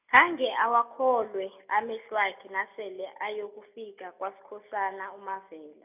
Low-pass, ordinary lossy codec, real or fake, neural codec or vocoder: 3.6 kHz; none; real; none